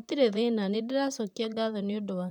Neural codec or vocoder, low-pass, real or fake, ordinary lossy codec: vocoder, 44.1 kHz, 128 mel bands, Pupu-Vocoder; 19.8 kHz; fake; none